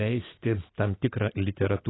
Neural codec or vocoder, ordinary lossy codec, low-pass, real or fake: none; AAC, 16 kbps; 7.2 kHz; real